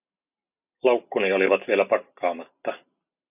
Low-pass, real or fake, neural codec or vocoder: 3.6 kHz; real; none